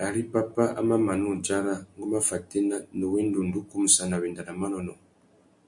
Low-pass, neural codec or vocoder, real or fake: 10.8 kHz; none; real